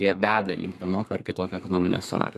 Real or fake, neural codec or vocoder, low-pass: fake; codec, 32 kHz, 1.9 kbps, SNAC; 14.4 kHz